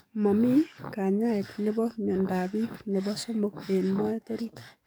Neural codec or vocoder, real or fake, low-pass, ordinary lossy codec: codec, 44.1 kHz, 7.8 kbps, DAC; fake; none; none